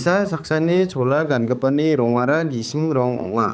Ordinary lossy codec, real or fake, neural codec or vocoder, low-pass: none; fake; codec, 16 kHz, 4 kbps, X-Codec, HuBERT features, trained on balanced general audio; none